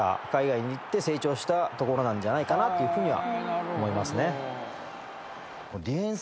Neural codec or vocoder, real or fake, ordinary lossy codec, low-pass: none; real; none; none